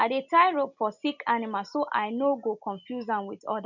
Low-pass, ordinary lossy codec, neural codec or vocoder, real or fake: 7.2 kHz; none; none; real